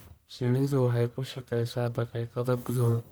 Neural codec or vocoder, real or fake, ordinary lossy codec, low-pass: codec, 44.1 kHz, 1.7 kbps, Pupu-Codec; fake; none; none